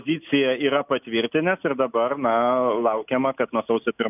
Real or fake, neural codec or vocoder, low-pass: real; none; 3.6 kHz